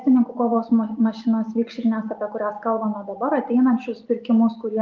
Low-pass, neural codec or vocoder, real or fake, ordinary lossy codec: 7.2 kHz; none; real; Opus, 32 kbps